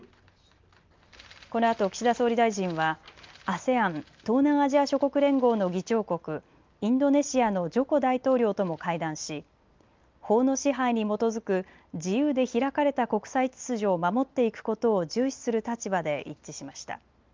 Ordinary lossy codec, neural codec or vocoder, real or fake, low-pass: Opus, 32 kbps; none; real; 7.2 kHz